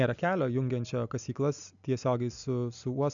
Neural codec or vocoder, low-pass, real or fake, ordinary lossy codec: none; 7.2 kHz; real; MP3, 96 kbps